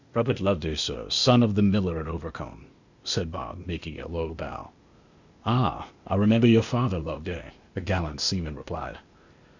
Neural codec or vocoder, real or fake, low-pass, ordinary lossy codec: codec, 16 kHz, 0.8 kbps, ZipCodec; fake; 7.2 kHz; Opus, 64 kbps